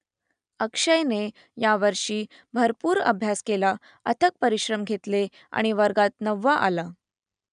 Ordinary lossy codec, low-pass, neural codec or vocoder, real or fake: none; 9.9 kHz; none; real